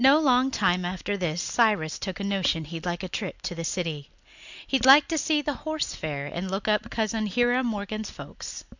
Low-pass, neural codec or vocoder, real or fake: 7.2 kHz; none; real